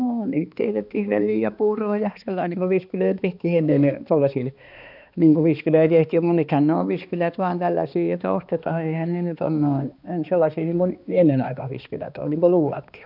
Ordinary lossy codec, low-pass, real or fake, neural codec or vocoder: none; 5.4 kHz; fake; codec, 16 kHz, 2 kbps, X-Codec, HuBERT features, trained on balanced general audio